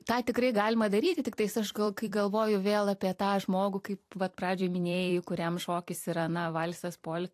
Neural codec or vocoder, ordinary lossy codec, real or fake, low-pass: vocoder, 44.1 kHz, 128 mel bands every 256 samples, BigVGAN v2; AAC, 64 kbps; fake; 14.4 kHz